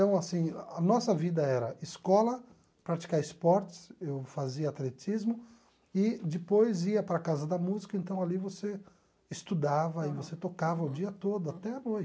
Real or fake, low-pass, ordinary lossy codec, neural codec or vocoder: real; none; none; none